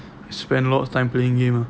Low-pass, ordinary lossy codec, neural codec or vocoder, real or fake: none; none; none; real